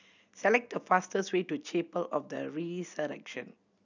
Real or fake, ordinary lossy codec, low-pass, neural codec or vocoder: real; none; 7.2 kHz; none